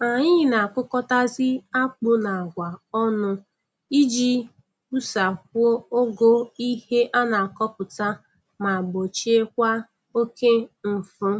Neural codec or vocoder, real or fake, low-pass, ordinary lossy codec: none; real; none; none